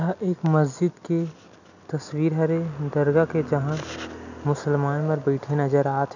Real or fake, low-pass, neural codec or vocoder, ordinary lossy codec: real; 7.2 kHz; none; none